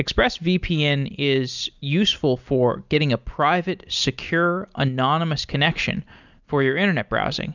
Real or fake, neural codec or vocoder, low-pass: real; none; 7.2 kHz